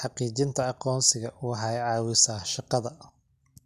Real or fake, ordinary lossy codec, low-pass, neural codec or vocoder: real; none; 14.4 kHz; none